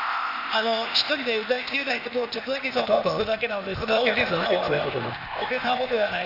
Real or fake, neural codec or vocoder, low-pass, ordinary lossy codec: fake; codec, 16 kHz, 0.8 kbps, ZipCodec; 5.4 kHz; none